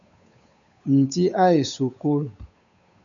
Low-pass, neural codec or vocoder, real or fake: 7.2 kHz; codec, 16 kHz, 8 kbps, FunCodec, trained on Chinese and English, 25 frames a second; fake